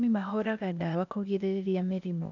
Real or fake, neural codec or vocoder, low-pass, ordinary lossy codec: fake; codec, 16 kHz, 0.8 kbps, ZipCodec; 7.2 kHz; none